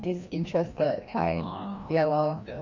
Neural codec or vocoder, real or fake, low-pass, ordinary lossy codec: codec, 16 kHz, 1 kbps, FreqCodec, larger model; fake; 7.2 kHz; none